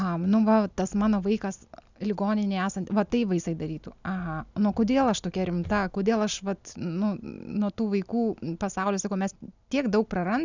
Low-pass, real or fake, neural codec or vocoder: 7.2 kHz; real; none